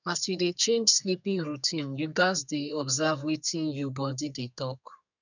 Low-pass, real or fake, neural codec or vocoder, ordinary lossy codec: 7.2 kHz; fake; codec, 32 kHz, 1.9 kbps, SNAC; none